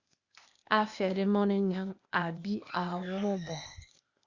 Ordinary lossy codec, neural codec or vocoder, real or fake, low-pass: Opus, 64 kbps; codec, 16 kHz, 0.8 kbps, ZipCodec; fake; 7.2 kHz